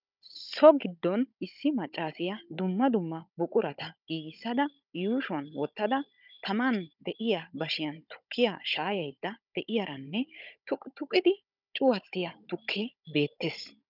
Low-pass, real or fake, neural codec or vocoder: 5.4 kHz; fake; codec, 16 kHz, 16 kbps, FunCodec, trained on Chinese and English, 50 frames a second